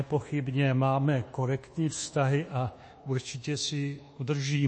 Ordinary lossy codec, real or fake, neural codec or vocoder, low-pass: MP3, 32 kbps; fake; codec, 24 kHz, 1.2 kbps, DualCodec; 9.9 kHz